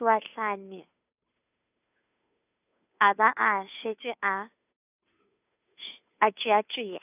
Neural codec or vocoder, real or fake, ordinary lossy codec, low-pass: codec, 16 kHz, 2 kbps, FunCodec, trained on Chinese and English, 25 frames a second; fake; none; 3.6 kHz